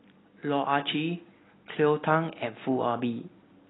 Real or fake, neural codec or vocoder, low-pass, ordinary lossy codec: real; none; 7.2 kHz; AAC, 16 kbps